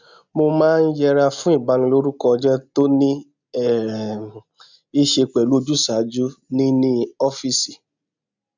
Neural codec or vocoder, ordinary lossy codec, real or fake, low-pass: none; none; real; 7.2 kHz